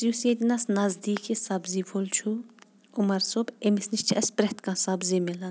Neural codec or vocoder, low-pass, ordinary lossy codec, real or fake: none; none; none; real